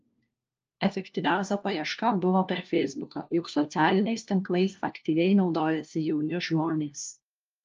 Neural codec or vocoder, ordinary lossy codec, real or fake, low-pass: codec, 16 kHz, 1 kbps, FunCodec, trained on LibriTTS, 50 frames a second; Opus, 24 kbps; fake; 7.2 kHz